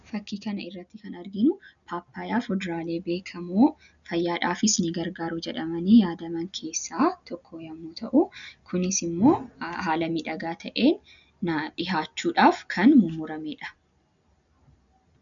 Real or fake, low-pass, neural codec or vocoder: real; 7.2 kHz; none